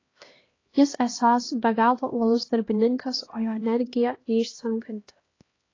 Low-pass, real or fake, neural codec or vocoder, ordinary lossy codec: 7.2 kHz; fake; codec, 16 kHz, 1 kbps, X-Codec, HuBERT features, trained on LibriSpeech; AAC, 32 kbps